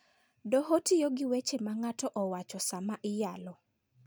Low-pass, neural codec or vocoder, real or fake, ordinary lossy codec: none; none; real; none